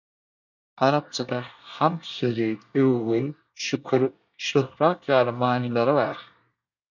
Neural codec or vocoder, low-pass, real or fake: codec, 24 kHz, 1 kbps, SNAC; 7.2 kHz; fake